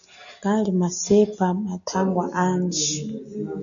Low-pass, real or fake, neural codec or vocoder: 7.2 kHz; real; none